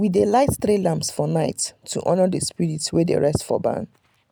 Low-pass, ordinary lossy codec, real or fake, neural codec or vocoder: none; none; real; none